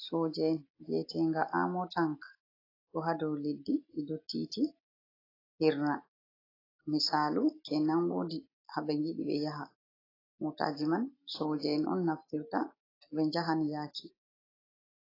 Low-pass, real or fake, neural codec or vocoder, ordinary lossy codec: 5.4 kHz; real; none; AAC, 24 kbps